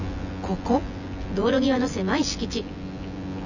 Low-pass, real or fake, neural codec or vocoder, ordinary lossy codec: 7.2 kHz; fake; vocoder, 24 kHz, 100 mel bands, Vocos; none